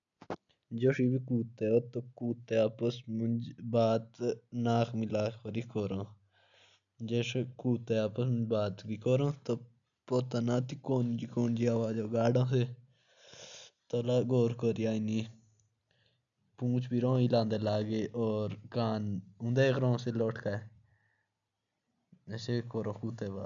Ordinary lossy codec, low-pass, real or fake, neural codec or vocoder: none; 7.2 kHz; real; none